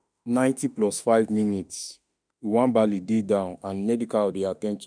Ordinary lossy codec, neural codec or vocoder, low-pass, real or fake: none; autoencoder, 48 kHz, 32 numbers a frame, DAC-VAE, trained on Japanese speech; 9.9 kHz; fake